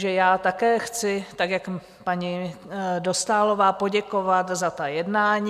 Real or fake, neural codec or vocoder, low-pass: real; none; 14.4 kHz